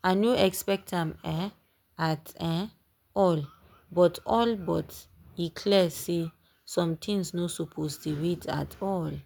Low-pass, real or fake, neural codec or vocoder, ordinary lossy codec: none; real; none; none